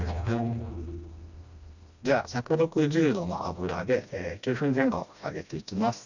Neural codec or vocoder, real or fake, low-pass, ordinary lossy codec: codec, 16 kHz, 1 kbps, FreqCodec, smaller model; fake; 7.2 kHz; none